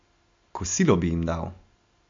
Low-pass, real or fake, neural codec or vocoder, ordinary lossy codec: 7.2 kHz; real; none; MP3, 48 kbps